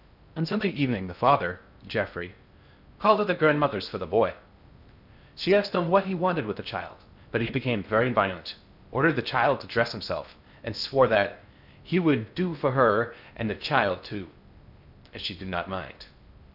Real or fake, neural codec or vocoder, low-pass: fake; codec, 16 kHz in and 24 kHz out, 0.6 kbps, FocalCodec, streaming, 2048 codes; 5.4 kHz